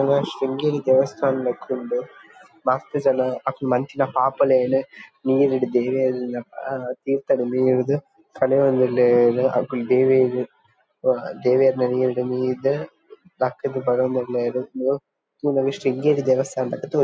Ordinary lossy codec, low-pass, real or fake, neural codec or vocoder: none; none; real; none